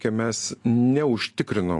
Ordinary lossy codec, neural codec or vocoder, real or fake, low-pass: AAC, 48 kbps; none; real; 10.8 kHz